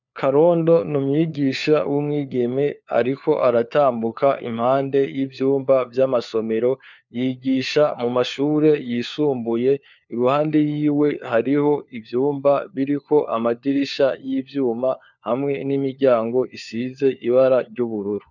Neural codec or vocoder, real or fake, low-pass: codec, 16 kHz, 4 kbps, FunCodec, trained on LibriTTS, 50 frames a second; fake; 7.2 kHz